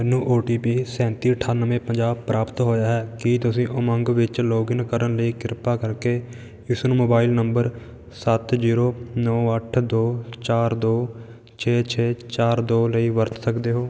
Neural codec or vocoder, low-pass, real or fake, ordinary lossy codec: none; none; real; none